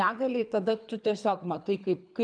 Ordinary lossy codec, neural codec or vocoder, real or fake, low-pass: MP3, 96 kbps; codec, 24 kHz, 3 kbps, HILCodec; fake; 9.9 kHz